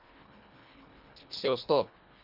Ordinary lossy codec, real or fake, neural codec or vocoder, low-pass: Opus, 64 kbps; fake; codec, 24 kHz, 1.5 kbps, HILCodec; 5.4 kHz